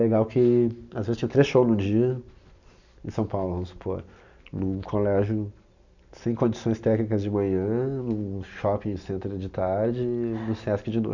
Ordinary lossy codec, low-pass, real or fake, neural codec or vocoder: none; 7.2 kHz; real; none